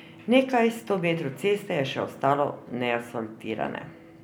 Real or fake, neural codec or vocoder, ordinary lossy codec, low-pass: real; none; none; none